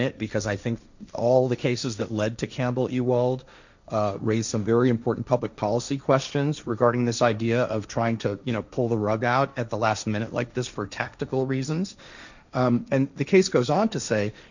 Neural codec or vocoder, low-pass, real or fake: codec, 16 kHz, 1.1 kbps, Voila-Tokenizer; 7.2 kHz; fake